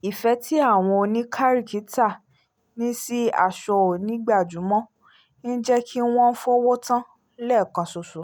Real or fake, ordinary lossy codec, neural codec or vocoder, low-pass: real; none; none; none